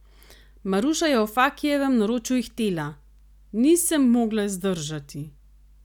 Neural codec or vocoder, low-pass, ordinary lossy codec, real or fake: none; 19.8 kHz; none; real